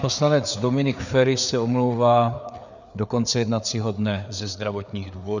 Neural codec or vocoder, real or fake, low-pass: codec, 16 kHz, 4 kbps, FreqCodec, larger model; fake; 7.2 kHz